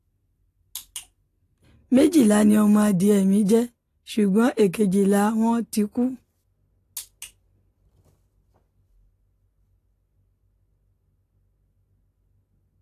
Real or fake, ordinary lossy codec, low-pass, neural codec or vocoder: real; AAC, 48 kbps; 14.4 kHz; none